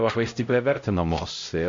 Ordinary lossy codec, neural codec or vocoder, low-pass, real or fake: AAC, 48 kbps; codec, 16 kHz, 0.5 kbps, X-Codec, HuBERT features, trained on LibriSpeech; 7.2 kHz; fake